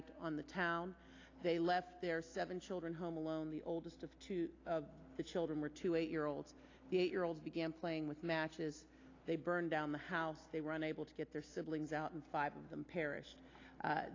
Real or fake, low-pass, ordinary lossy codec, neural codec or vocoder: real; 7.2 kHz; AAC, 32 kbps; none